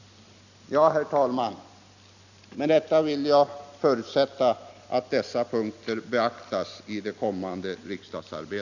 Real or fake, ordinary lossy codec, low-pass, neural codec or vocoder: real; none; 7.2 kHz; none